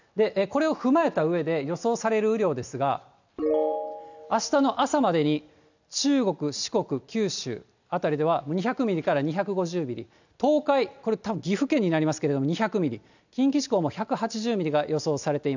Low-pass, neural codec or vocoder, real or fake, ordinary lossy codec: 7.2 kHz; none; real; none